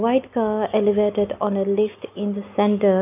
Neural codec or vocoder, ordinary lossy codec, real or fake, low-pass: none; none; real; 3.6 kHz